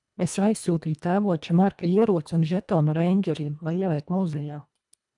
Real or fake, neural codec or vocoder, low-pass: fake; codec, 24 kHz, 1.5 kbps, HILCodec; 10.8 kHz